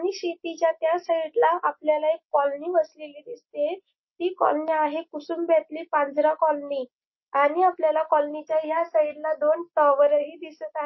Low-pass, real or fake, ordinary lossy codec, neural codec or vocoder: 7.2 kHz; real; MP3, 24 kbps; none